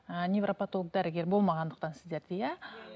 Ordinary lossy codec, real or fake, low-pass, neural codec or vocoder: none; real; none; none